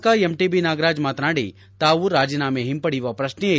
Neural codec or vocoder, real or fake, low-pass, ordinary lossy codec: none; real; none; none